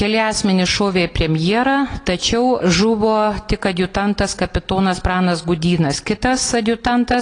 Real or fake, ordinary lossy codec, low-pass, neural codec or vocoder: real; AAC, 32 kbps; 9.9 kHz; none